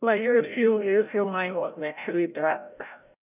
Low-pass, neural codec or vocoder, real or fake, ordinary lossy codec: 3.6 kHz; codec, 16 kHz, 0.5 kbps, FreqCodec, larger model; fake; none